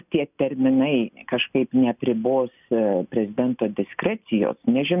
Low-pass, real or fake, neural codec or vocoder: 3.6 kHz; real; none